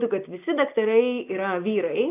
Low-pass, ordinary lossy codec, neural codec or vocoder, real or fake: 3.6 kHz; AAC, 24 kbps; none; real